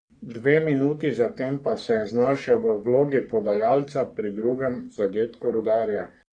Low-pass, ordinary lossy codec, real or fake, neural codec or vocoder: 9.9 kHz; none; fake; codec, 44.1 kHz, 3.4 kbps, Pupu-Codec